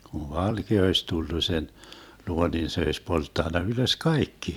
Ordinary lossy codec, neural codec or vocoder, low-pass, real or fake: none; vocoder, 44.1 kHz, 128 mel bands every 256 samples, BigVGAN v2; 19.8 kHz; fake